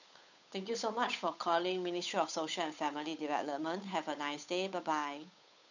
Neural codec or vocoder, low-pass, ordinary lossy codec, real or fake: codec, 16 kHz, 8 kbps, FunCodec, trained on Chinese and English, 25 frames a second; 7.2 kHz; none; fake